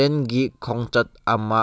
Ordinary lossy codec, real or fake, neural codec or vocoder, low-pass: none; real; none; none